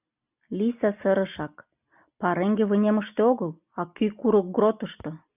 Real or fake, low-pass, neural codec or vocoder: real; 3.6 kHz; none